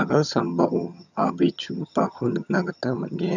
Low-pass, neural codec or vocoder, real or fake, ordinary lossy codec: 7.2 kHz; vocoder, 22.05 kHz, 80 mel bands, HiFi-GAN; fake; none